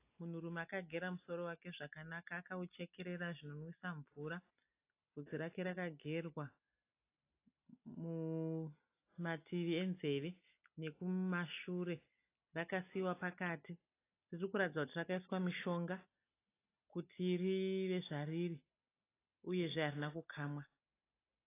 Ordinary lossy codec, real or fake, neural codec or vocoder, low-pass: AAC, 24 kbps; real; none; 3.6 kHz